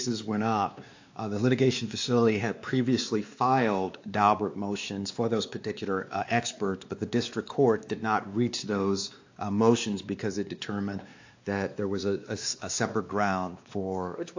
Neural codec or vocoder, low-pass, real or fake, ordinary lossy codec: codec, 16 kHz, 2 kbps, X-Codec, WavLM features, trained on Multilingual LibriSpeech; 7.2 kHz; fake; AAC, 48 kbps